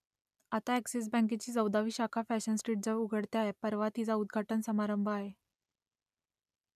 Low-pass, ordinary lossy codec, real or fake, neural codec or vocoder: 14.4 kHz; none; real; none